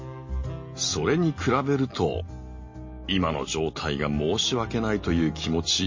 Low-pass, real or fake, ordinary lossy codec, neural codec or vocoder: 7.2 kHz; real; MP3, 32 kbps; none